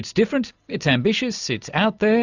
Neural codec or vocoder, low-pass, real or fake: none; 7.2 kHz; real